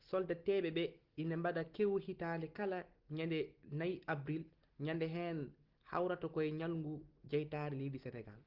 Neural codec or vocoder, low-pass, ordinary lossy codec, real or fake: none; 5.4 kHz; Opus, 16 kbps; real